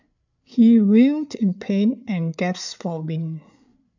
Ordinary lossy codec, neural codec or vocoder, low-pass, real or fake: none; codec, 16 kHz, 8 kbps, FreqCodec, larger model; 7.2 kHz; fake